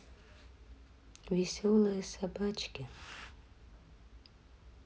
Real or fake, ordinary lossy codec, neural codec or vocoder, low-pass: real; none; none; none